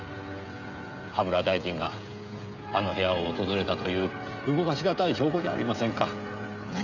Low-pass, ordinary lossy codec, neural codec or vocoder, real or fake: 7.2 kHz; none; codec, 16 kHz, 16 kbps, FreqCodec, smaller model; fake